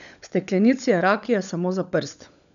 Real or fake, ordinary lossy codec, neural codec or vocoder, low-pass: fake; none; codec, 16 kHz, 16 kbps, FunCodec, trained on LibriTTS, 50 frames a second; 7.2 kHz